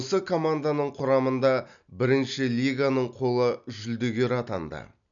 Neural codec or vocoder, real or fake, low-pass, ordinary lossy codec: none; real; 7.2 kHz; none